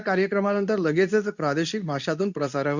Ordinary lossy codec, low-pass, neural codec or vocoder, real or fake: none; 7.2 kHz; codec, 24 kHz, 0.9 kbps, WavTokenizer, medium speech release version 2; fake